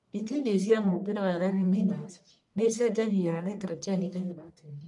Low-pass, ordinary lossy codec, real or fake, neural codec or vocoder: 10.8 kHz; none; fake; codec, 44.1 kHz, 1.7 kbps, Pupu-Codec